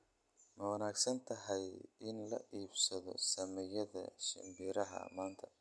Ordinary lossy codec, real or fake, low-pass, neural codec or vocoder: none; real; none; none